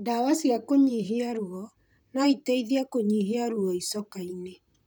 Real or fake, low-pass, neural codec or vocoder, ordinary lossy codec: fake; none; vocoder, 44.1 kHz, 128 mel bands, Pupu-Vocoder; none